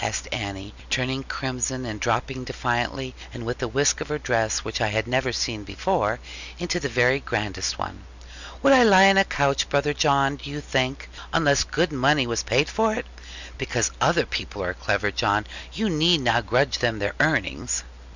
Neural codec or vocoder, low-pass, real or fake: none; 7.2 kHz; real